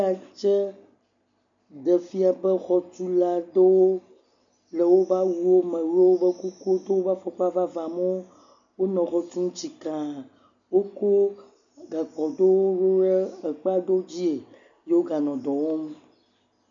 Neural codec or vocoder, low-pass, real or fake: none; 7.2 kHz; real